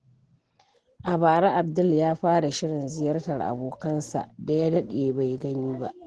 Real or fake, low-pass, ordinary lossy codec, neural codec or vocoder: real; 10.8 kHz; Opus, 16 kbps; none